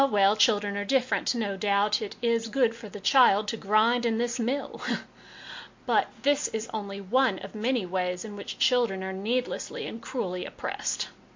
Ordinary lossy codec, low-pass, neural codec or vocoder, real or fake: MP3, 48 kbps; 7.2 kHz; none; real